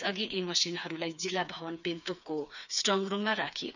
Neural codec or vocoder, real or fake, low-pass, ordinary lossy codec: codec, 16 kHz, 4 kbps, FreqCodec, smaller model; fake; 7.2 kHz; none